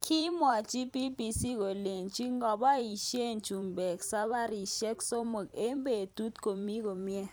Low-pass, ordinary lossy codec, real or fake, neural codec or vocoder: none; none; fake; vocoder, 44.1 kHz, 128 mel bands every 512 samples, BigVGAN v2